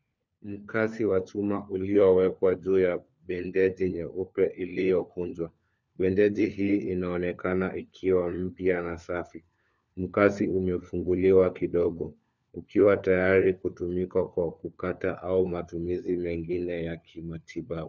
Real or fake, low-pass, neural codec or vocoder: fake; 7.2 kHz; codec, 16 kHz, 4 kbps, FunCodec, trained on LibriTTS, 50 frames a second